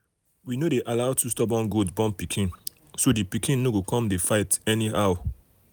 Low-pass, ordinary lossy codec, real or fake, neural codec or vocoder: none; none; real; none